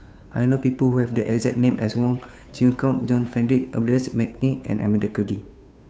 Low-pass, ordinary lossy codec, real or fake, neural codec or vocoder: none; none; fake; codec, 16 kHz, 2 kbps, FunCodec, trained on Chinese and English, 25 frames a second